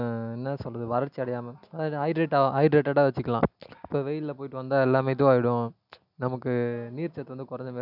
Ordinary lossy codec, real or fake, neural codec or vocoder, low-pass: none; real; none; 5.4 kHz